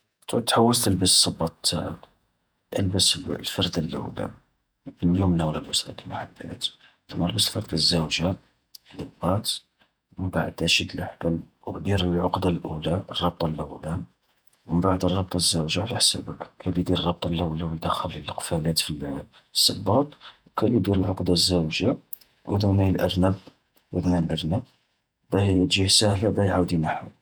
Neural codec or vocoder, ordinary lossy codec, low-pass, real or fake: vocoder, 48 kHz, 128 mel bands, Vocos; none; none; fake